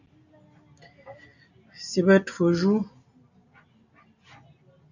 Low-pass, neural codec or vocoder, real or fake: 7.2 kHz; none; real